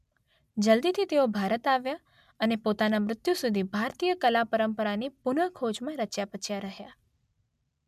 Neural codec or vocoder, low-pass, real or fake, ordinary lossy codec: none; 14.4 kHz; real; MP3, 96 kbps